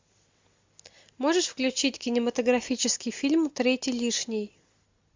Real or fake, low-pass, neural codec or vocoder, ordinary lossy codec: real; 7.2 kHz; none; MP3, 64 kbps